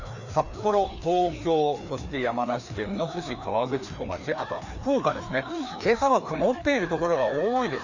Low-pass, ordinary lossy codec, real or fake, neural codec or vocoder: 7.2 kHz; none; fake; codec, 16 kHz, 2 kbps, FreqCodec, larger model